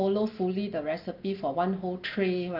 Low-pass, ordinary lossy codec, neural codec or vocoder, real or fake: 5.4 kHz; Opus, 32 kbps; none; real